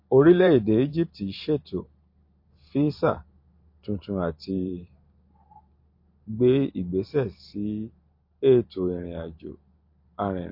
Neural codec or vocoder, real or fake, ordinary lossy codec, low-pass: vocoder, 44.1 kHz, 128 mel bands every 512 samples, BigVGAN v2; fake; MP3, 32 kbps; 5.4 kHz